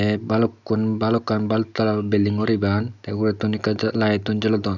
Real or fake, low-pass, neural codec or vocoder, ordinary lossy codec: real; 7.2 kHz; none; none